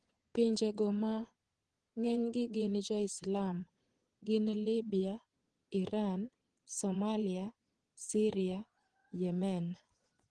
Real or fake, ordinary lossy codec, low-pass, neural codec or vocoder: fake; Opus, 16 kbps; 9.9 kHz; vocoder, 22.05 kHz, 80 mel bands, Vocos